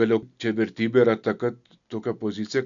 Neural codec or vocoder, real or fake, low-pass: none; real; 7.2 kHz